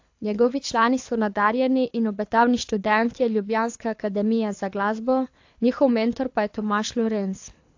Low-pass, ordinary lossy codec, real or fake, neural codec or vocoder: 7.2 kHz; AAC, 48 kbps; fake; codec, 24 kHz, 6 kbps, HILCodec